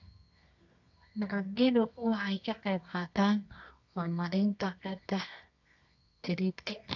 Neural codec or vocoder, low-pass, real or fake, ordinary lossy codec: codec, 24 kHz, 0.9 kbps, WavTokenizer, medium music audio release; 7.2 kHz; fake; AAC, 48 kbps